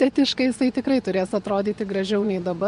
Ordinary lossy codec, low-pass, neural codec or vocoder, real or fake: MP3, 64 kbps; 10.8 kHz; vocoder, 24 kHz, 100 mel bands, Vocos; fake